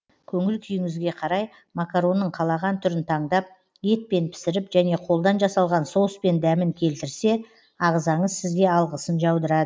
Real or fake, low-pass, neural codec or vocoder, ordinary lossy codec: real; none; none; none